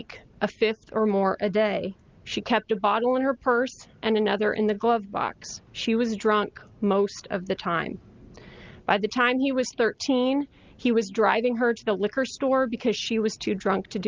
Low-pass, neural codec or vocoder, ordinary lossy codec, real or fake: 7.2 kHz; none; Opus, 32 kbps; real